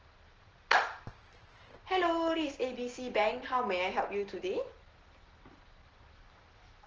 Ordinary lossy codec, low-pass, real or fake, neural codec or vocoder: Opus, 16 kbps; 7.2 kHz; real; none